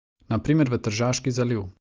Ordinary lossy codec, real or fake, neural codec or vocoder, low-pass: Opus, 32 kbps; real; none; 7.2 kHz